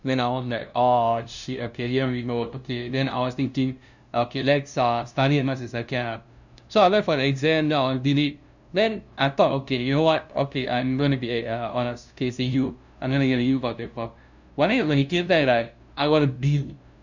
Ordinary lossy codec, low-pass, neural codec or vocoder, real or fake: none; 7.2 kHz; codec, 16 kHz, 0.5 kbps, FunCodec, trained on LibriTTS, 25 frames a second; fake